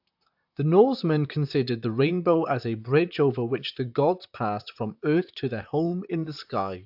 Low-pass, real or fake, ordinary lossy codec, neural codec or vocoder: 5.4 kHz; fake; AAC, 48 kbps; vocoder, 44.1 kHz, 128 mel bands, Pupu-Vocoder